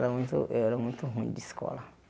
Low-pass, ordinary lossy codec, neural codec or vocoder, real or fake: none; none; none; real